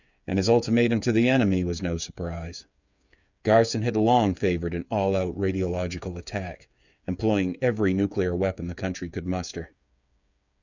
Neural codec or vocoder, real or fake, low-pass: codec, 16 kHz, 8 kbps, FreqCodec, smaller model; fake; 7.2 kHz